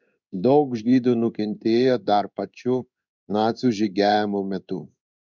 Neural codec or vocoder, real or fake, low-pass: codec, 16 kHz in and 24 kHz out, 1 kbps, XY-Tokenizer; fake; 7.2 kHz